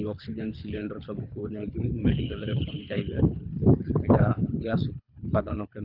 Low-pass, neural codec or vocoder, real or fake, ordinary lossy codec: 5.4 kHz; codec, 24 kHz, 3 kbps, HILCodec; fake; none